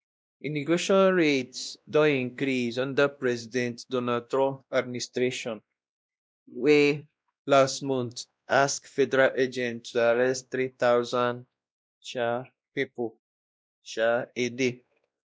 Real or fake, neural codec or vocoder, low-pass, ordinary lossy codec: fake; codec, 16 kHz, 1 kbps, X-Codec, WavLM features, trained on Multilingual LibriSpeech; none; none